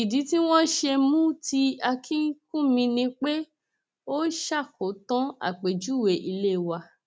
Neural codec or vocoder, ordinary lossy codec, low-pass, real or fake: none; none; none; real